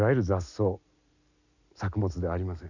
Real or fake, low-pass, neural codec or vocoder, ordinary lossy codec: real; 7.2 kHz; none; none